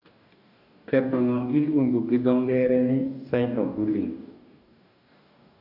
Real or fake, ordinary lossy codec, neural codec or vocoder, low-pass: fake; none; codec, 44.1 kHz, 2.6 kbps, DAC; 5.4 kHz